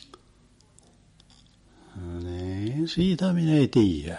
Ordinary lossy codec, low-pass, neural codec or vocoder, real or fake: MP3, 48 kbps; 19.8 kHz; none; real